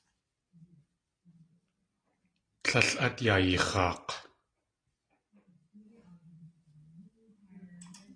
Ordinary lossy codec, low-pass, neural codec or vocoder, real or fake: AAC, 32 kbps; 9.9 kHz; none; real